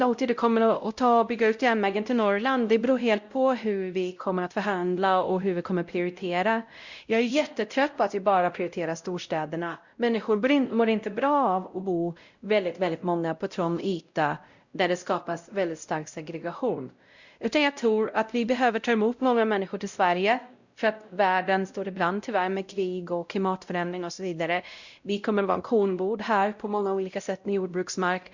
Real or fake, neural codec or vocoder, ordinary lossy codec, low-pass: fake; codec, 16 kHz, 0.5 kbps, X-Codec, WavLM features, trained on Multilingual LibriSpeech; Opus, 64 kbps; 7.2 kHz